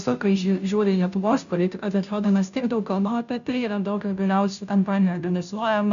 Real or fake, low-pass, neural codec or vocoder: fake; 7.2 kHz; codec, 16 kHz, 0.5 kbps, FunCodec, trained on Chinese and English, 25 frames a second